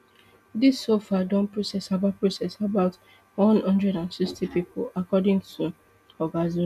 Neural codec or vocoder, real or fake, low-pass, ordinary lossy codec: none; real; 14.4 kHz; none